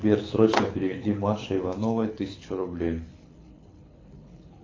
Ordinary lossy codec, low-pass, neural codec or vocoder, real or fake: AAC, 32 kbps; 7.2 kHz; codec, 24 kHz, 6 kbps, HILCodec; fake